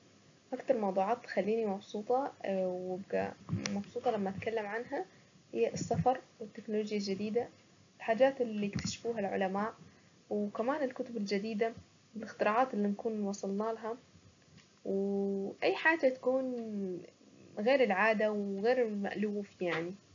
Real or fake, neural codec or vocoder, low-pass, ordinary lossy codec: real; none; 7.2 kHz; AAC, 64 kbps